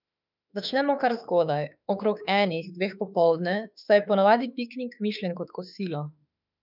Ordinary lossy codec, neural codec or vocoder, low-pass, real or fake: none; autoencoder, 48 kHz, 32 numbers a frame, DAC-VAE, trained on Japanese speech; 5.4 kHz; fake